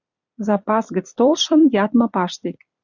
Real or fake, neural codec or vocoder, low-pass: fake; vocoder, 44.1 kHz, 128 mel bands every 256 samples, BigVGAN v2; 7.2 kHz